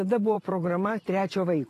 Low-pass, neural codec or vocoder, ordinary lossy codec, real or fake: 14.4 kHz; vocoder, 44.1 kHz, 128 mel bands every 512 samples, BigVGAN v2; AAC, 48 kbps; fake